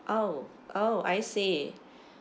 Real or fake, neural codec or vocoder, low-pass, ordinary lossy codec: real; none; none; none